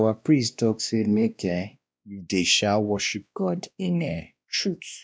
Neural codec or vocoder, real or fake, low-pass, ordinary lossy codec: codec, 16 kHz, 1 kbps, X-Codec, WavLM features, trained on Multilingual LibriSpeech; fake; none; none